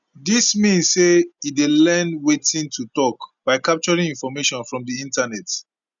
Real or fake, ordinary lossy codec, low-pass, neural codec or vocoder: real; none; 7.2 kHz; none